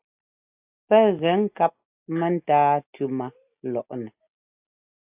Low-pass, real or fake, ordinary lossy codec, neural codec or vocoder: 3.6 kHz; real; AAC, 32 kbps; none